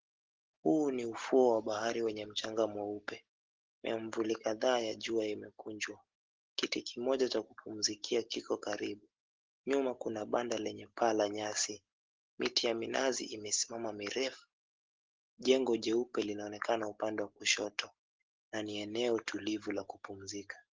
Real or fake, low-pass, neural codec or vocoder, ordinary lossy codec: real; 7.2 kHz; none; Opus, 16 kbps